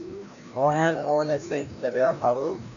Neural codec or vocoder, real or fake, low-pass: codec, 16 kHz, 1 kbps, FreqCodec, larger model; fake; 7.2 kHz